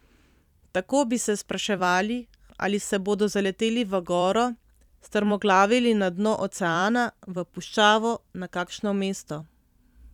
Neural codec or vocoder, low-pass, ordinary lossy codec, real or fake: vocoder, 44.1 kHz, 128 mel bands every 256 samples, BigVGAN v2; 19.8 kHz; none; fake